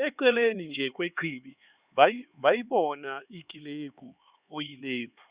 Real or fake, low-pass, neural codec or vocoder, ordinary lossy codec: fake; 3.6 kHz; codec, 16 kHz, 4 kbps, X-Codec, HuBERT features, trained on LibriSpeech; Opus, 64 kbps